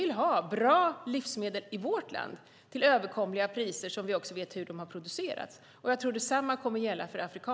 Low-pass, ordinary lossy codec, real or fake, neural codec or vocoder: none; none; real; none